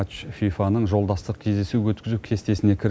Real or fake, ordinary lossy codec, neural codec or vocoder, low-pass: real; none; none; none